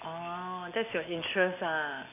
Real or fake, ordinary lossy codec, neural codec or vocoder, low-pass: real; none; none; 3.6 kHz